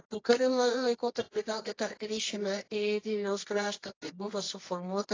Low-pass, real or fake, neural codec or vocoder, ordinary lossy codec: 7.2 kHz; fake; codec, 24 kHz, 0.9 kbps, WavTokenizer, medium music audio release; AAC, 32 kbps